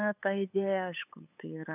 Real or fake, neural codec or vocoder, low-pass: fake; codec, 16 kHz, 8 kbps, FunCodec, trained on LibriTTS, 25 frames a second; 3.6 kHz